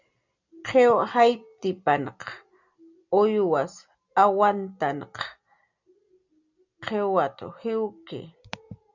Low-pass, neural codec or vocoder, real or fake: 7.2 kHz; none; real